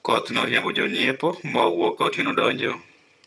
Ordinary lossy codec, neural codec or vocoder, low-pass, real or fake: none; vocoder, 22.05 kHz, 80 mel bands, HiFi-GAN; none; fake